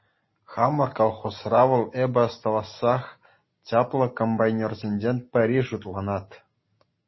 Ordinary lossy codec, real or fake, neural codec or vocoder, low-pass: MP3, 24 kbps; real; none; 7.2 kHz